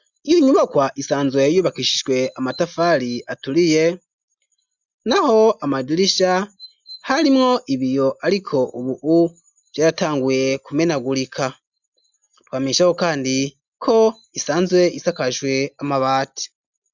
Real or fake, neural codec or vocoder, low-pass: real; none; 7.2 kHz